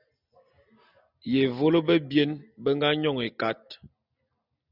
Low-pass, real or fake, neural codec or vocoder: 5.4 kHz; real; none